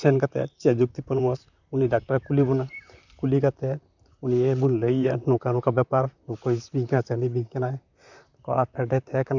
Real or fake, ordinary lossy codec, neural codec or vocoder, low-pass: fake; none; vocoder, 44.1 kHz, 128 mel bands, Pupu-Vocoder; 7.2 kHz